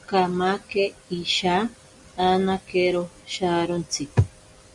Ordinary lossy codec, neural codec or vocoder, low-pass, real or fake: Opus, 64 kbps; none; 10.8 kHz; real